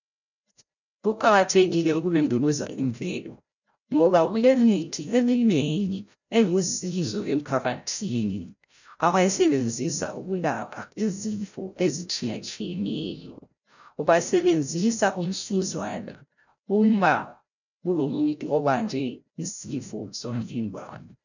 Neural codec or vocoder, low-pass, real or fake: codec, 16 kHz, 0.5 kbps, FreqCodec, larger model; 7.2 kHz; fake